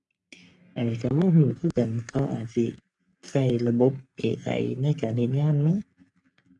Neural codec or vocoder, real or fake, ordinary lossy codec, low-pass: codec, 44.1 kHz, 3.4 kbps, Pupu-Codec; fake; none; 10.8 kHz